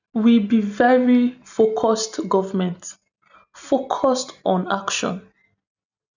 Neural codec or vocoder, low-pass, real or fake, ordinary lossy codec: none; 7.2 kHz; real; none